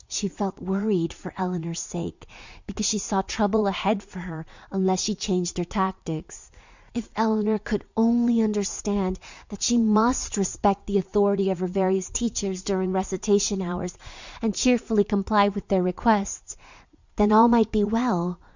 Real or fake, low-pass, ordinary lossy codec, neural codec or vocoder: fake; 7.2 kHz; Opus, 64 kbps; vocoder, 44.1 kHz, 80 mel bands, Vocos